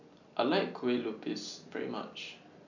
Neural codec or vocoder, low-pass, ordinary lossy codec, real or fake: none; 7.2 kHz; none; real